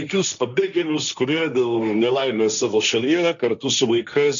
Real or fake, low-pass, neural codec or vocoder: fake; 7.2 kHz; codec, 16 kHz, 1.1 kbps, Voila-Tokenizer